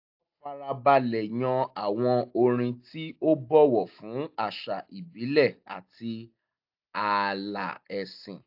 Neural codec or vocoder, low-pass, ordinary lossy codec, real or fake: none; 5.4 kHz; AAC, 48 kbps; real